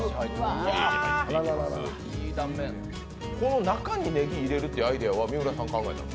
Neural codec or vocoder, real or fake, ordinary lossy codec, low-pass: none; real; none; none